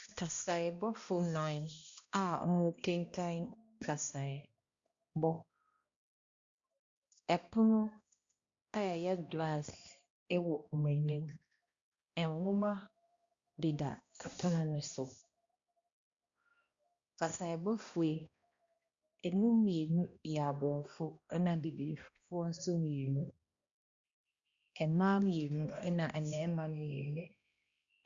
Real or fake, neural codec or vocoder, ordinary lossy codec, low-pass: fake; codec, 16 kHz, 1 kbps, X-Codec, HuBERT features, trained on balanced general audio; Opus, 64 kbps; 7.2 kHz